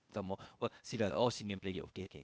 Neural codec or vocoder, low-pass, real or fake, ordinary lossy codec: codec, 16 kHz, 0.8 kbps, ZipCodec; none; fake; none